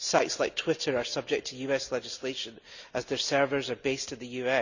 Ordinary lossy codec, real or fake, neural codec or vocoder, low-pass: none; real; none; 7.2 kHz